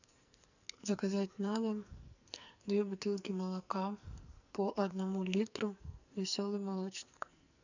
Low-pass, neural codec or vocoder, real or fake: 7.2 kHz; codec, 44.1 kHz, 2.6 kbps, SNAC; fake